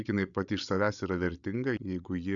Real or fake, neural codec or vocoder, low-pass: fake; codec, 16 kHz, 16 kbps, FreqCodec, larger model; 7.2 kHz